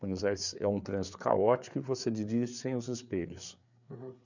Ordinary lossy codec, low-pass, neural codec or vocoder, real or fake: none; 7.2 kHz; codec, 16 kHz, 4 kbps, FreqCodec, larger model; fake